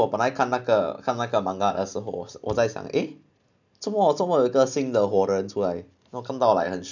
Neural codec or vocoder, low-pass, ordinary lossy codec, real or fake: none; 7.2 kHz; none; real